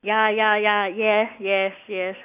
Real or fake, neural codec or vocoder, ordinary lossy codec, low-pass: real; none; none; 3.6 kHz